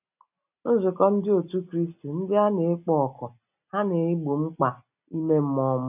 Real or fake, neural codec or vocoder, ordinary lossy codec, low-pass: real; none; none; 3.6 kHz